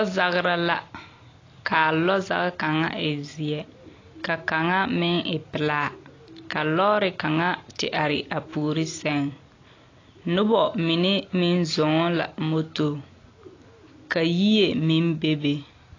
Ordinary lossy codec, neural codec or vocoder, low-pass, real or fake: AAC, 32 kbps; none; 7.2 kHz; real